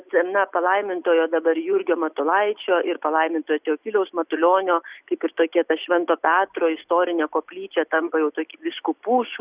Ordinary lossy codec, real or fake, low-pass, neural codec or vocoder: Opus, 64 kbps; real; 3.6 kHz; none